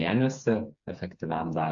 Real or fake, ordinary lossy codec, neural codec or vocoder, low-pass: fake; AAC, 64 kbps; codec, 16 kHz, 4 kbps, FreqCodec, smaller model; 7.2 kHz